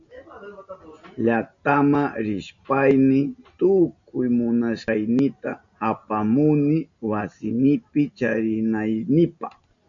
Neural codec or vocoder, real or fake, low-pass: none; real; 7.2 kHz